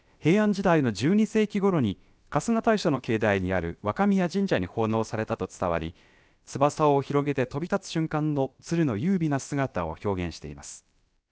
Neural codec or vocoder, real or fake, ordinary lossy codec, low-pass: codec, 16 kHz, about 1 kbps, DyCAST, with the encoder's durations; fake; none; none